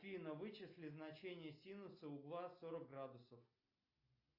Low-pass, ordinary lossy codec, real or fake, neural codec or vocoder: 5.4 kHz; AAC, 32 kbps; real; none